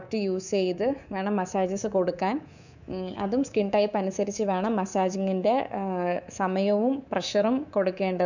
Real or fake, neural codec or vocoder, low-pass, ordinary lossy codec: fake; codec, 24 kHz, 3.1 kbps, DualCodec; 7.2 kHz; none